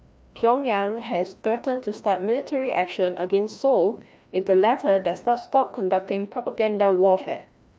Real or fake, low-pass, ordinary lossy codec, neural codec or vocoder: fake; none; none; codec, 16 kHz, 1 kbps, FreqCodec, larger model